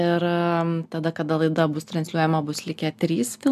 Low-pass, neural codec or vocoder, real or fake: 14.4 kHz; none; real